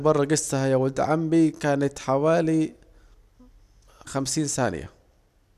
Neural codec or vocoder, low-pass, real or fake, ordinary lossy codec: none; 14.4 kHz; real; none